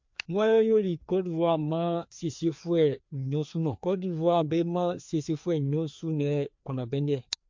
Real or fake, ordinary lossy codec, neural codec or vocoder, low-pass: fake; MP3, 48 kbps; codec, 16 kHz, 2 kbps, FreqCodec, larger model; 7.2 kHz